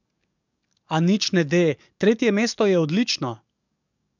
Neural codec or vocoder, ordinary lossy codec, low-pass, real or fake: codec, 44.1 kHz, 7.8 kbps, DAC; none; 7.2 kHz; fake